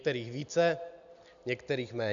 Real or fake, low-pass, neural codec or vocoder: real; 7.2 kHz; none